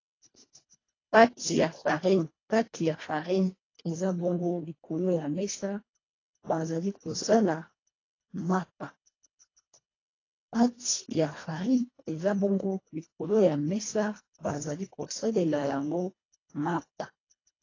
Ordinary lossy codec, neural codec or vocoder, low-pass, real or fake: AAC, 32 kbps; codec, 24 kHz, 1.5 kbps, HILCodec; 7.2 kHz; fake